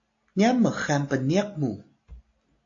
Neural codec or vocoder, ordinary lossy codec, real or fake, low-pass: none; AAC, 48 kbps; real; 7.2 kHz